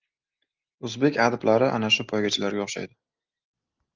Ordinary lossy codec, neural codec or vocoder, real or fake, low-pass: Opus, 24 kbps; none; real; 7.2 kHz